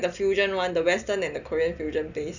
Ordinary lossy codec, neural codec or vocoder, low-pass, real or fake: none; none; 7.2 kHz; real